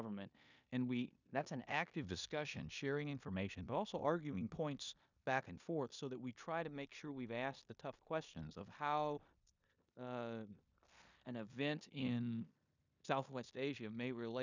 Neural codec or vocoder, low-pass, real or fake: codec, 16 kHz in and 24 kHz out, 0.9 kbps, LongCat-Audio-Codec, four codebook decoder; 7.2 kHz; fake